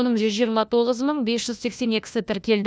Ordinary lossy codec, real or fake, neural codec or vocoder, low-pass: none; fake; codec, 16 kHz, 1 kbps, FunCodec, trained on LibriTTS, 50 frames a second; none